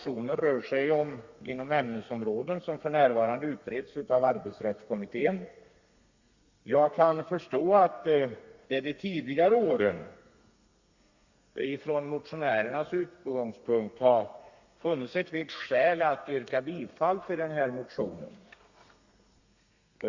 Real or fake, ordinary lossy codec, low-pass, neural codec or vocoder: fake; Opus, 64 kbps; 7.2 kHz; codec, 32 kHz, 1.9 kbps, SNAC